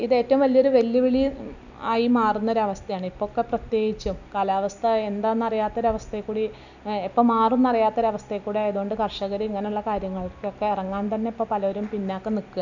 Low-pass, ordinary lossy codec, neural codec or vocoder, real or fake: 7.2 kHz; none; none; real